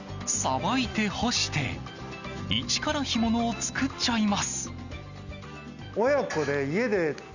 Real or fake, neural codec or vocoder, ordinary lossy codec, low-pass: real; none; none; 7.2 kHz